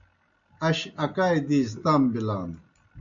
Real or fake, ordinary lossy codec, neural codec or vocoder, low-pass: real; AAC, 64 kbps; none; 7.2 kHz